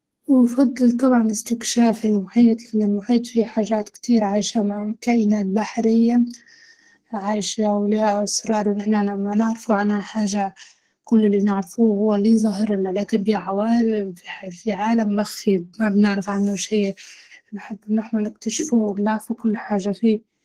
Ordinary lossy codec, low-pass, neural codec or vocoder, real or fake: Opus, 16 kbps; 14.4 kHz; codec, 32 kHz, 1.9 kbps, SNAC; fake